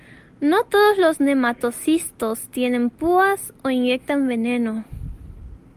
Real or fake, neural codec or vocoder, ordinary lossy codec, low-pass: real; none; Opus, 32 kbps; 14.4 kHz